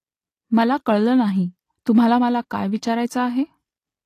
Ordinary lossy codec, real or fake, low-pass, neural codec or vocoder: AAC, 48 kbps; real; 14.4 kHz; none